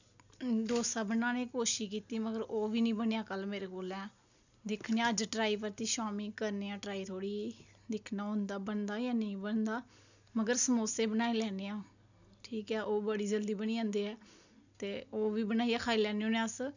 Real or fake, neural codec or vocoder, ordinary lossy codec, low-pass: real; none; none; 7.2 kHz